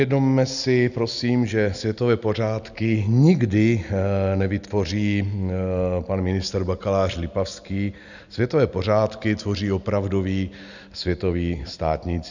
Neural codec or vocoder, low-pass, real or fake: none; 7.2 kHz; real